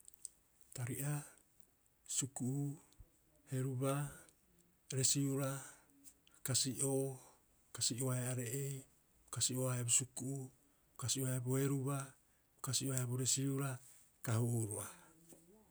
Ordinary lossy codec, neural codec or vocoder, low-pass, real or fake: none; none; none; real